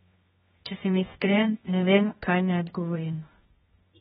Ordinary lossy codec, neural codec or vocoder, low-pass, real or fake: AAC, 16 kbps; codec, 24 kHz, 0.9 kbps, WavTokenizer, medium music audio release; 10.8 kHz; fake